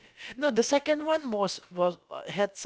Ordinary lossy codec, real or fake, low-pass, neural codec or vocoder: none; fake; none; codec, 16 kHz, about 1 kbps, DyCAST, with the encoder's durations